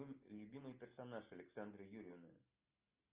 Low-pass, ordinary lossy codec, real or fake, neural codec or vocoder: 3.6 kHz; Opus, 24 kbps; real; none